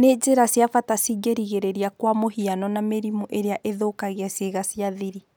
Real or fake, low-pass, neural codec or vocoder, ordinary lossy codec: real; none; none; none